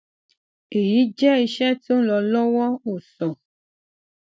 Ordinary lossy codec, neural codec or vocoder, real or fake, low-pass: none; none; real; none